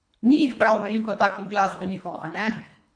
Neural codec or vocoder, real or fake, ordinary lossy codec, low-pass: codec, 24 kHz, 1.5 kbps, HILCodec; fake; MP3, 64 kbps; 9.9 kHz